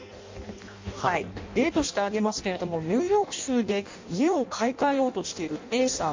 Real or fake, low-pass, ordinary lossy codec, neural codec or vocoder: fake; 7.2 kHz; MP3, 48 kbps; codec, 16 kHz in and 24 kHz out, 0.6 kbps, FireRedTTS-2 codec